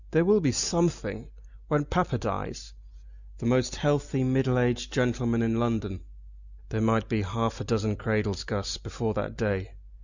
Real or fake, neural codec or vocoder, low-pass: real; none; 7.2 kHz